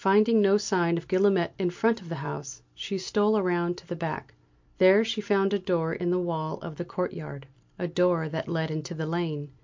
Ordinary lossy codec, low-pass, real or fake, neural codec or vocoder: MP3, 48 kbps; 7.2 kHz; real; none